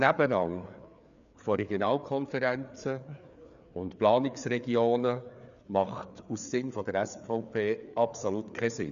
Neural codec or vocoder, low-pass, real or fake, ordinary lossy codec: codec, 16 kHz, 4 kbps, FreqCodec, larger model; 7.2 kHz; fake; none